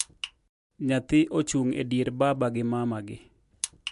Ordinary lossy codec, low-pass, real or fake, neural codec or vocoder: MP3, 64 kbps; 10.8 kHz; real; none